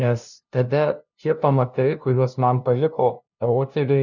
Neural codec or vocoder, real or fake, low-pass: codec, 16 kHz, 0.5 kbps, FunCodec, trained on LibriTTS, 25 frames a second; fake; 7.2 kHz